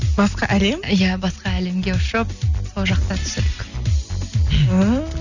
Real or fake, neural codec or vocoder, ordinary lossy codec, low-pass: real; none; none; 7.2 kHz